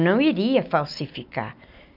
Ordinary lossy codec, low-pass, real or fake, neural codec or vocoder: none; 5.4 kHz; real; none